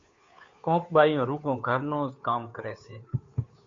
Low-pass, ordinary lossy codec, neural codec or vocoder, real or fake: 7.2 kHz; MP3, 48 kbps; codec, 16 kHz, 2 kbps, FunCodec, trained on Chinese and English, 25 frames a second; fake